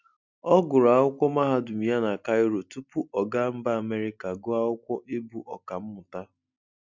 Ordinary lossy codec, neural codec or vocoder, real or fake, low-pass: none; none; real; 7.2 kHz